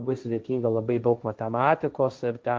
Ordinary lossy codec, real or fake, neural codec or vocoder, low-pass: Opus, 24 kbps; fake; codec, 16 kHz, 1.1 kbps, Voila-Tokenizer; 7.2 kHz